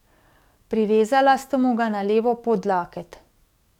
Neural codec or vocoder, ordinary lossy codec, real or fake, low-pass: codec, 44.1 kHz, 7.8 kbps, DAC; none; fake; 19.8 kHz